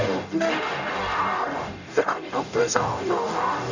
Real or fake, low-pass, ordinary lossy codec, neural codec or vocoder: fake; 7.2 kHz; none; codec, 44.1 kHz, 0.9 kbps, DAC